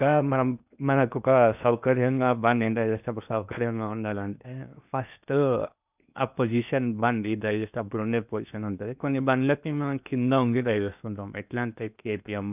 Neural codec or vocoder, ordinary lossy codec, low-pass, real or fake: codec, 16 kHz in and 24 kHz out, 0.8 kbps, FocalCodec, streaming, 65536 codes; none; 3.6 kHz; fake